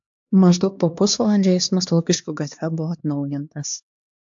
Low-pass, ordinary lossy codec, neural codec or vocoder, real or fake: 7.2 kHz; AAC, 64 kbps; codec, 16 kHz, 2 kbps, X-Codec, HuBERT features, trained on LibriSpeech; fake